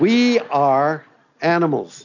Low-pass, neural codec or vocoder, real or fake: 7.2 kHz; none; real